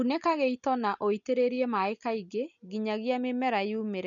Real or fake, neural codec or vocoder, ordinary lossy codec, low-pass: real; none; none; 7.2 kHz